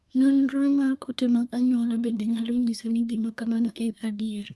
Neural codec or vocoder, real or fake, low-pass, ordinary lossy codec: codec, 24 kHz, 1 kbps, SNAC; fake; none; none